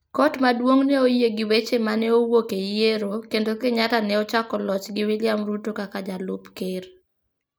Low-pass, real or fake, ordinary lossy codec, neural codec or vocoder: none; real; none; none